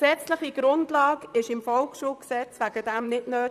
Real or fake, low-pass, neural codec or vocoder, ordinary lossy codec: fake; 14.4 kHz; vocoder, 44.1 kHz, 128 mel bands, Pupu-Vocoder; none